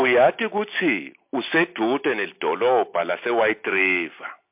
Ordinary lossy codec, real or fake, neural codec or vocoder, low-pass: MP3, 32 kbps; real; none; 3.6 kHz